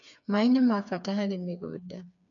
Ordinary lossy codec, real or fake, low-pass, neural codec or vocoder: none; fake; 7.2 kHz; codec, 16 kHz, 4 kbps, FreqCodec, smaller model